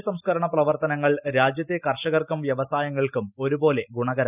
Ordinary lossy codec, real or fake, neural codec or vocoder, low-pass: none; real; none; 3.6 kHz